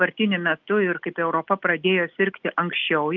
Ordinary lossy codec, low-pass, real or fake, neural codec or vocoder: Opus, 32 kbps; 7.2 kHz; real; none